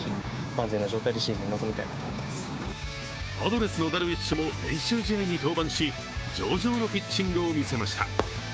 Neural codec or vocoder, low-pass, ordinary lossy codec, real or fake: codec, 16 kHz, 6 kbps, DAC; none; none; fake